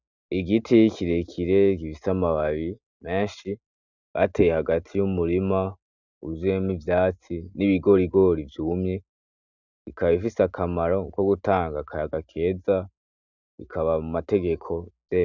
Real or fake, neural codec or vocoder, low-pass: real; none; 7.2 kHz